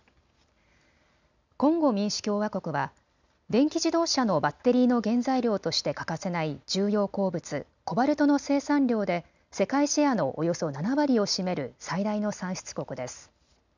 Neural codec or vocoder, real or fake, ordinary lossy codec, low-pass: none; real; none; 7.2 kHz